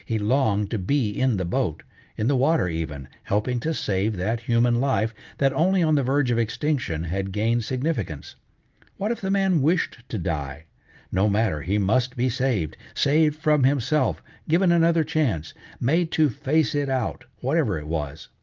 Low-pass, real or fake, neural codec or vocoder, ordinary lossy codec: 7.2 kHz; real; none; Opus, 32 kbps